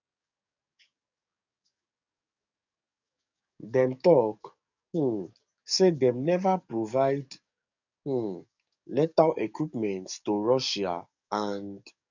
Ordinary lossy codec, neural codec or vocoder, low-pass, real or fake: none; codec, 44.1 kHz, 7.8 kbps, DAC; 7.2 kHz; fake